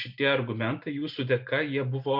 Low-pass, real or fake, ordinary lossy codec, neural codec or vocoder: 5.4 kHz; real; MP3, 48 kbps; none